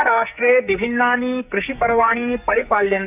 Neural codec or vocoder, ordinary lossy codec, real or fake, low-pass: codec, 44.1 kHz, 2.6 kbps, SNAC; none; fake; 3.6 kHz